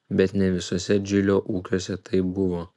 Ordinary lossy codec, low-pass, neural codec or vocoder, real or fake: MP3, 96 kbps; 10.8 kHz; none; real